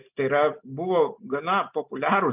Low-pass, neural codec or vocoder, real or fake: 3.6 kHz; none; real